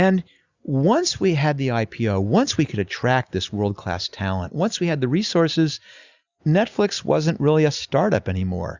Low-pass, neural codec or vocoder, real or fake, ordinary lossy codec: 7.2 kHz; none; real; Opus, 64 kbps